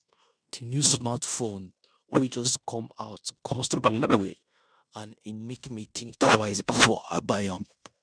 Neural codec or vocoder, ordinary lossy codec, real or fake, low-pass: codec, 16 kHz in and 24 kHz out, 0.9 kbps, LongCat-Audio-Codec, fine tuned four codebook decoder; none; fake; 9.9 kHz